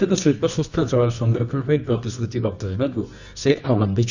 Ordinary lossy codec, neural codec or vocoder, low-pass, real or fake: none; codec, 24 kHz, 0.9 kbps, WavTokenizer, medium music audio release; 7.2 kHz; fake